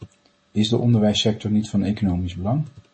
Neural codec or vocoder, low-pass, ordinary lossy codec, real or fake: none; 9.9 kHz; MP3, 32 kbps; real